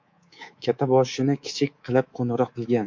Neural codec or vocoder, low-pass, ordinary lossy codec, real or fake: codec, 24 kHz, 3.1 kbps, DualCodec; 7.2 kHz; MP3, 48 kbps; fake